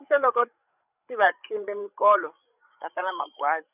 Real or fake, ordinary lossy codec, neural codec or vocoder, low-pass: fake; none; codec, 16 kHz, 16 kbps, FreqCodec, larger model; 3.6 kHz